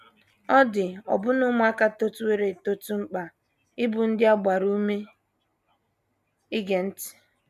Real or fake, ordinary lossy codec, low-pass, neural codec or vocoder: real; none; 14.4 kHz; none